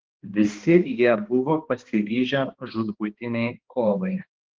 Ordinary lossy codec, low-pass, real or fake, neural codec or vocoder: Opus, 16 kbps; 7.2 kHz; fake; codec, 16 kHz, 2 kbps, X-Codec, HuBERT features, trained on balanced general audio